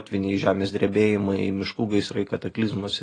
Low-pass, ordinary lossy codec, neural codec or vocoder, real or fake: 9.9 kHz; AAC, 32 kbps; vocoder, 44.1 kHz, 128 mel bands every 512 samples, BigVGAN v2; fake